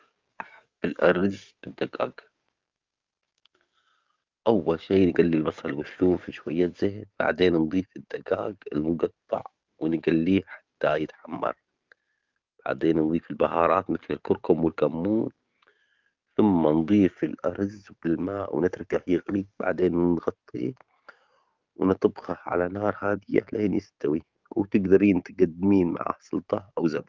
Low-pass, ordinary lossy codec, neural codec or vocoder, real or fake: 7.2 kHz; Opus, 32 kbps; codec, 44.1 kHz, 7.8 kbps, DAC; fake